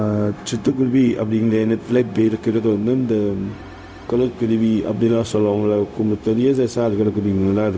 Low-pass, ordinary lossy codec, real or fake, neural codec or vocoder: none; none; fake; codec, 16 kHz, 0.4 kbps, LongCat-Audio-Codec